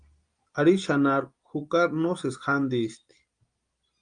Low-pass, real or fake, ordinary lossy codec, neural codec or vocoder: 9.9 kHz; real; Opus, 32 kbps; none